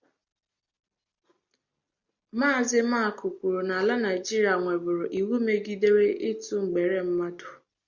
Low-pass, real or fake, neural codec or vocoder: 7.2 kHz; real; none